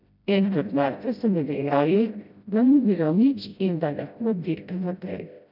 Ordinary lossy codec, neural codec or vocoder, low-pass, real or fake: none; codec, 16 kHz, 0.5 kbps, FreqCodec, smaller model; 5.4 kHz; fake